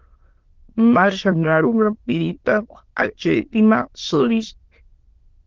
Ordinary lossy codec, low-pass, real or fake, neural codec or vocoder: Opus, 16 kbps; 7.2 kHz; fake; autoencoder, 22.05 kHz, a latent of 192 numbers a frame, VITS, trained on many speakers